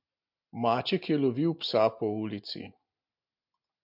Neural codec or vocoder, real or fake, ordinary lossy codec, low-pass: none; real; MP3, 48 kbps; 5.4 kHz